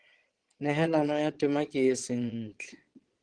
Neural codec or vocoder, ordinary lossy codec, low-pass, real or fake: vocoder, 22.05 kHz, 80 mel bands, Vocos; Opus, 16 kbps; 9.9 kHz; fake